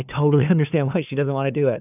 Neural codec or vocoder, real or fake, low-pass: codec, 16 kHz, 2 kbps, FunCodec, trained on LibriTTS, 25 frames a second; fake; 3.6 kHz